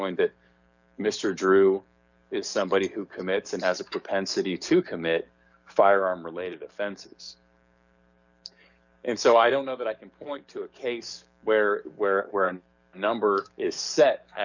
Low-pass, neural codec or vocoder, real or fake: 7.2 kHz; codec, 44.1 kHz, 7.8 kbps, DAC; fake